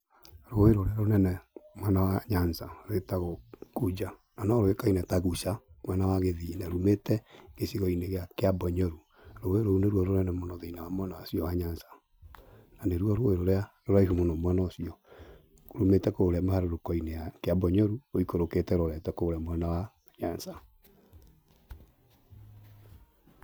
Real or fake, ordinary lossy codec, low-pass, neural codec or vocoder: real; none; none; none